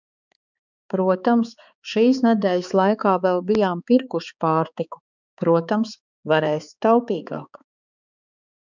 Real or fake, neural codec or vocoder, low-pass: fake; codec, 16 kHz, 4 kbps, X-Codec, HuBERT features, trained on balanced general audio; 7.2 kHz